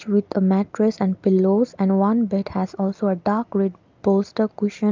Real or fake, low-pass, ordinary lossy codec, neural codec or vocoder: real; 7.2 kHz; Opus, 24 kbps; none